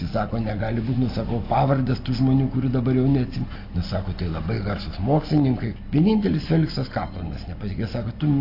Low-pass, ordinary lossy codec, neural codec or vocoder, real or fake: 5.4 kHz; AAC, 32 kbps; none; real